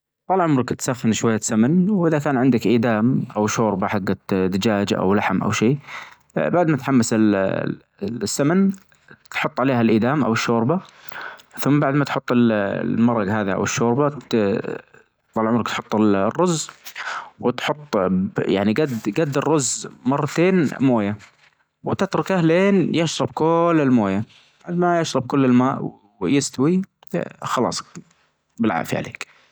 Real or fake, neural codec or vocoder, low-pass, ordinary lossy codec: real; none; none; none